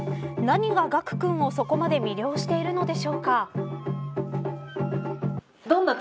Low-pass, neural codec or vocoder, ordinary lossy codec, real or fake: none; none; none; real